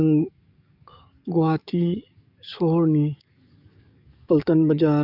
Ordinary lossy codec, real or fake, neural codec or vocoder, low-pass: none; fake; codec, 44.1 kHz, 7.8 kbps, DAC; 5.4 kHz